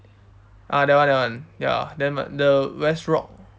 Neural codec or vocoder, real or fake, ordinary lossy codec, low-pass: none; real; none; none